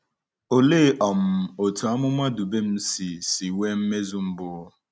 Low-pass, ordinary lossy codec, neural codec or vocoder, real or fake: none; none; none; real